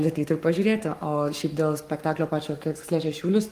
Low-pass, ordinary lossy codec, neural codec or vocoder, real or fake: 14.4 kHz; Opus, 32 kbps; codec, 44.1 kHz, 7.8 kbps, Pupu-Codec; fake